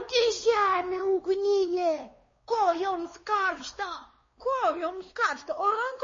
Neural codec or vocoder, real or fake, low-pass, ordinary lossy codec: codec, 16 kHz, 2 kbps, X-Codec, WavLM features, trained on Multilingual LibriSpeech; fake; 7.2 kHz; MP3, 32 kbps